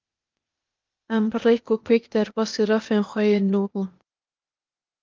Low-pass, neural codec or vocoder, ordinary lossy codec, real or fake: 7.2 kHz; codec, 16 kHz, 0.8 kbps, ZipCodec; Opus, 32 kbps; fake